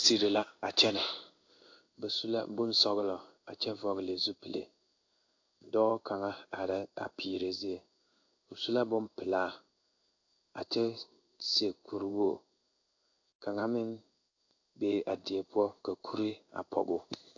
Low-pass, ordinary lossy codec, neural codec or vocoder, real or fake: 7.2 kHz; AAC, 48 kbps; codec, 16 kHz in and 24 kHz out, 1 kbps, XY-Tokenizer; fake